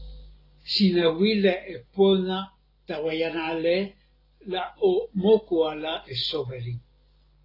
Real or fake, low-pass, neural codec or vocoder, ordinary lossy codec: real; 5.4 kHz; none; AAC, 24 kbps